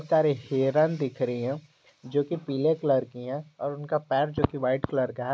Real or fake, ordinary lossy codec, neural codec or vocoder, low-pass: real; none; none; none